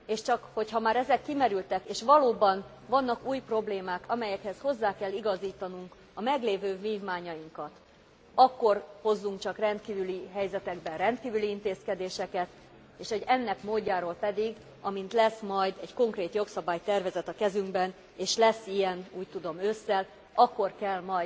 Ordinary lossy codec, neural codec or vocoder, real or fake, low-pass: none; none; real; none